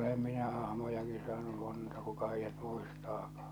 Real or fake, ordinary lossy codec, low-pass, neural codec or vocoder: real; none; none; none